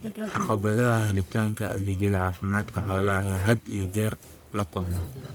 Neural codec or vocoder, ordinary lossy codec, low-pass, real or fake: codec, 44.1 kHz, 1.7 kbps, Pupu-Codec; none; none; fake